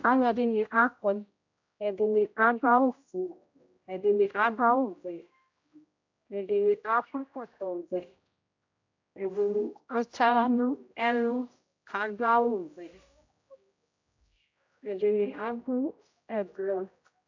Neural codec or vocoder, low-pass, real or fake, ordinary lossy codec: codec, 16 kHz, 0.5 kbps, X-Codec, HuBERT features, trained on general audio; 7.2 kHz; fake; AAC, 48 kbps